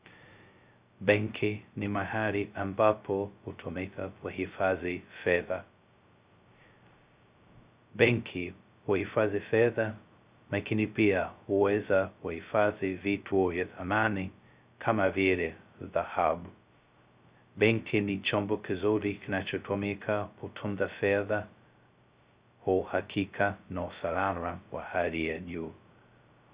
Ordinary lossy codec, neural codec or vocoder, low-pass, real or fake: Opus, 64 kbps; codec, 16 kHz, 0.2 kbps, FocalCodec; 3.6 kHz; fake